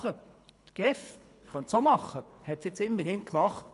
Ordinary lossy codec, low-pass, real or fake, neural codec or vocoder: none; 10.8 kHz; fake; codec, 24 kHz, 3 kbps, HILCodec